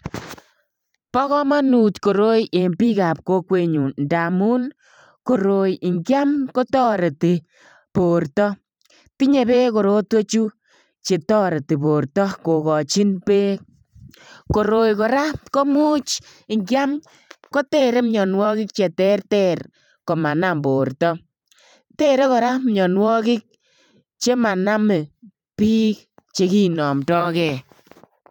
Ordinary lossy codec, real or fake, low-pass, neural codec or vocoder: none; fake; 19.8 kHz; vocoder, 44.1 kHz, 128 mel bands every 512 samples, BigVGAN v2